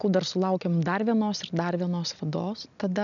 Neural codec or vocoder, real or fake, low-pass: none; real; 7.2 kHz